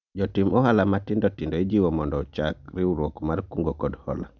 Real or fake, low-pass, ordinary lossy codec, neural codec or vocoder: real; 7.2 kHz; none; none